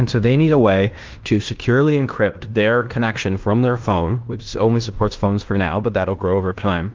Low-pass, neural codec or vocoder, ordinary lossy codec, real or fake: 7.2 kHz; codec, 16 kHz in and 24 kHz out, 0.9 kbps, LongCat-Audio-Codec, fine tuned four codebook decoder; Opus, 32 kbps; fake